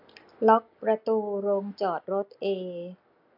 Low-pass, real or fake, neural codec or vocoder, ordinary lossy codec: 5.4 kHz; real; none; AAC, 48 kbps